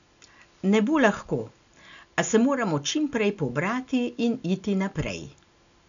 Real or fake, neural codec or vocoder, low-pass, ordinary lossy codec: real; none; 7.2 kHz; none